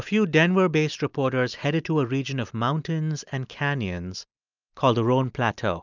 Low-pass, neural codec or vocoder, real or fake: 7.2 kHz; none; real